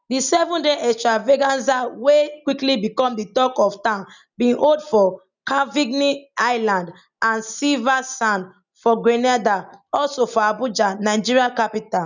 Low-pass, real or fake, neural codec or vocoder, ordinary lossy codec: 7.2 kHz; real; none; none